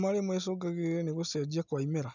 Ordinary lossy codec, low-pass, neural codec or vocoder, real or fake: none; 7.2 kHz; none; real